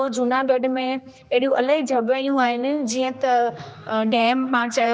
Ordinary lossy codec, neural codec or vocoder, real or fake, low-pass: none; codec, 16 kHz, 2 kbps, X-Codec, HuBERT features, trained on general audio; fake; none